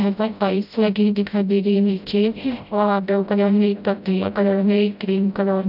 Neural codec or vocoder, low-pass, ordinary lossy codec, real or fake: codec, 16 kHz, 0.5 kbps, FreqCodec, smaller model; 5.4 kHz; none; fake